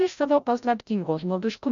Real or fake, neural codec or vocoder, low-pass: fake; codec, 16 kHz, 0.5 kbps, FreqCodec, larger model; 7.2 kHz